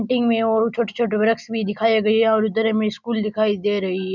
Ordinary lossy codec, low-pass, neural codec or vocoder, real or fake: none; 7.2 kHz; none; real